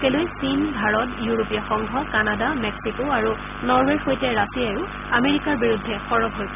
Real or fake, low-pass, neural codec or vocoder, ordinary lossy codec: real; 3.6 kHz; none; none